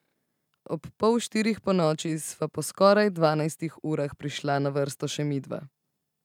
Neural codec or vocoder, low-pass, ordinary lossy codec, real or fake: none; 19.8 kHz; none; real